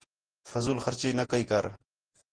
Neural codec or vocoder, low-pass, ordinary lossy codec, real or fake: vocoder, 48 kHz, 128 mel bands, Vocos; 9.9 kHz; Opus, 16 kbps; fake